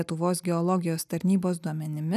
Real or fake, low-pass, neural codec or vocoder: real; 14.4 kHz; none